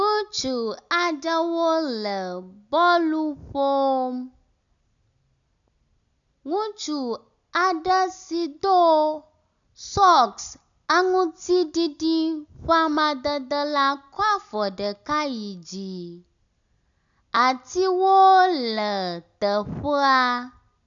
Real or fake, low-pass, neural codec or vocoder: real; 7.2 kHz; none